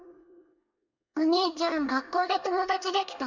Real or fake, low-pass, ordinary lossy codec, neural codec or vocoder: fake; 7.2 kHz; none; codec, 24 kHz, 1 kbps, SNAC